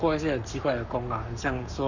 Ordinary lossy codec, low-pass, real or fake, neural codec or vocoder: none; 7.2 kHz; fake; codec, 44.1 kHz, 7.8 kbps, Pupu-Codec